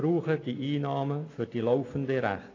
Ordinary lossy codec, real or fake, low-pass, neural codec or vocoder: AAC, 32 kbps; real; 7.2 kHz; none